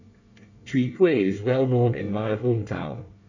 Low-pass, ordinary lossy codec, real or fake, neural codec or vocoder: 7.2 kHz; none; fake; codec, 24 kHz, 1 kbps, SNAC